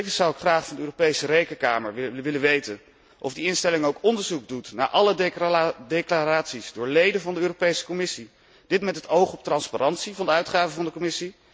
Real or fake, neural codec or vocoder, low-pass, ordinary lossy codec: real; none; none; none